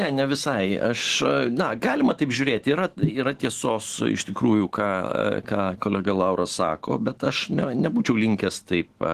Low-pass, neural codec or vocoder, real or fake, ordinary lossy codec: 14.4 kHz; autoencoder, 48 kHz, 128 numbers a frame, DAC-VAE, trained on Japanese speech; fake; Opus, 16 kbps